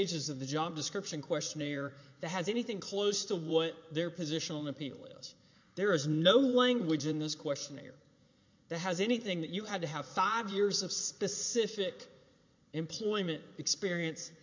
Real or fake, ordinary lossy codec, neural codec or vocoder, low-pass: fake; MP3, 48 kbps; vocoder, 44.1 kHz, 80 mel bands, Vocos; 7.2 kHz